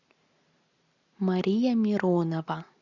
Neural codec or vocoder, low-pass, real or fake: none; 7.2 kHz; real